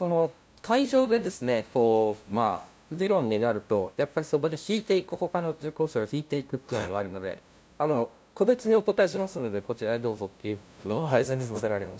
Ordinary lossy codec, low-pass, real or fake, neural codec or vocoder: none; none; fake; codec, 16 kHz, 0.5 kbps, FunCodec, trained on LibriTTS, 25 frames a second